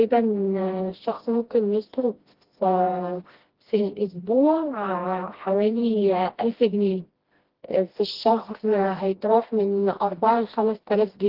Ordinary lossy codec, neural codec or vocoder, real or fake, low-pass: Opus, 16 kbps; codec, 16 kHz, 1 kbps, FreqCodec, smaller model; fake; 5.4 kHz